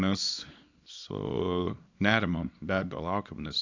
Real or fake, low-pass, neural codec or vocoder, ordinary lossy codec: fake; 7.2 kHz; codec, 24 kHz, 0.9 kbps, WavTokenizer, small release; AAC, 48 kbps